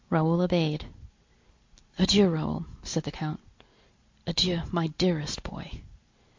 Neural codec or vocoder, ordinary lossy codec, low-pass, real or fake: none; MP3, 48 kbps; 7.2 kHz; real